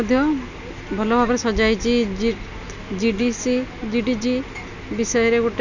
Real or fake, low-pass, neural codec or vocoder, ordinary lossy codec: real; 7.2 kHz; none; none